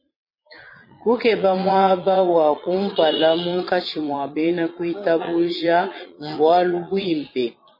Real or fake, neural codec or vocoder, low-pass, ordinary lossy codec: fake; vocoder, 22.05 kHz, 80 mel bands, Vocos; 5.4 kHz; MP3, 24 kbps